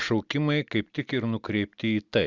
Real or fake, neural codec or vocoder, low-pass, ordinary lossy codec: real; none; 7.2 kHz; Opus, 64 kbps